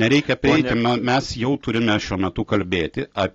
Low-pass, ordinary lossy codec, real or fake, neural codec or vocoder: 7.2 kHz; AAC, 32 kbps; real; none